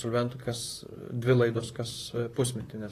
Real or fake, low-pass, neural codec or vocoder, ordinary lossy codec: fake; 14.4 kHz; vocoder, 44.1 kHz, 128 mel bands, Pupu-Vocoder; AAC, 48 kbps